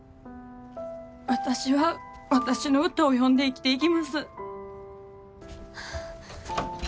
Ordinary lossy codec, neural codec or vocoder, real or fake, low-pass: none; none; real; none